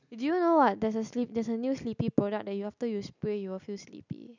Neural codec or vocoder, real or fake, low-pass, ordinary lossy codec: none; real; 7.2 kHz; none